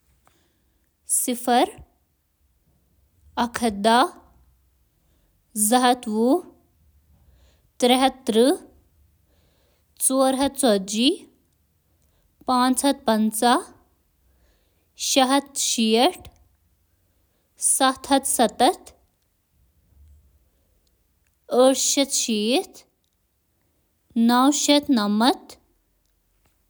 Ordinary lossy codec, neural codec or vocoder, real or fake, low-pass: none; none; real; none